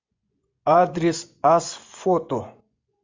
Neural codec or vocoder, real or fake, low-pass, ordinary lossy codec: vocoder, 24 kHz, 100 mel bands, Vocos; fake; 7.2 kHz; MP3, 64 kbps